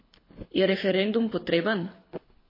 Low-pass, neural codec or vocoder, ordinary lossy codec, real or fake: 5.4 kHz; codec, 24 kHz, 6 kbps, HILCodec; MP3, 24 kbps; fake